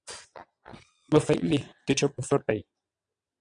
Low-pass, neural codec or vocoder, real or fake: 9.9 kHz; vocoder, 22.05 kHz, 80 mel bands, WaveNeXt; fake